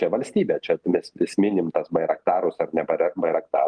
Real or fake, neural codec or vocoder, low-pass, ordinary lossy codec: real; none; 9.9 kHz; Opus, 32 kbps